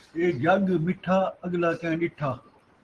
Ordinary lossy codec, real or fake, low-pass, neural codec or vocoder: Opus, 16 kbps; real; 10.8 kHz; none